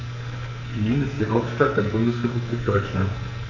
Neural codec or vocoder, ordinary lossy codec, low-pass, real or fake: codec, 44.1 kHz, 2.6 kbps, SNAC; none; 7.2 kHz; fake